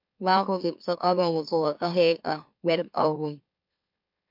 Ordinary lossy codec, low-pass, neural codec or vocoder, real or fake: MP3, 48 kbps; 5.4 kHz; autoencoder, 44.1 kHz, a latent of 192 numbers a frame, MeloTTS; fake